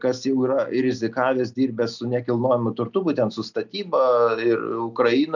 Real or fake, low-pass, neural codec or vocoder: real; 7.2 kHz; none